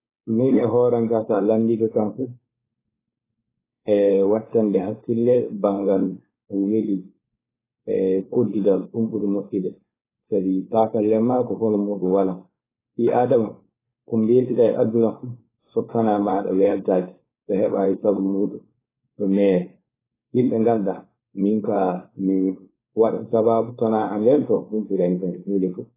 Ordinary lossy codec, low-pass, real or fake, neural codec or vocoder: AAC, 16 kbps; 3.6 kHz; fake; codec, 16 kHz, 4.8 kbps, FACodec